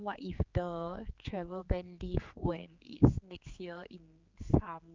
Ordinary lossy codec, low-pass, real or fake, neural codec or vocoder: Opus, 24 kbps; 7.2 kHz; fake; codec, 16 kHz, 4 kbps, X-Codec, HuBERT features, trained on general audio